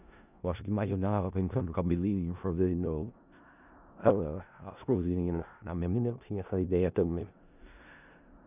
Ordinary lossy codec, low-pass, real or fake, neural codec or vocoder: none; 3.6 kHz; fake; codec, 16 kHz in and 24 kHz out, 0.4 kbps, LongCat-Audio-Codec, four codebook decoder